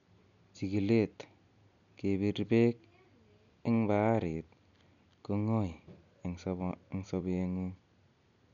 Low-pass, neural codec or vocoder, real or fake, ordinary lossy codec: 7.2 kHz; none; real; none